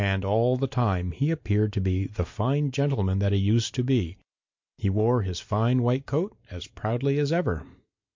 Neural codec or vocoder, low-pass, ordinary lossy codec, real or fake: none; 7.2 kHz; MP3, 48 kbps; real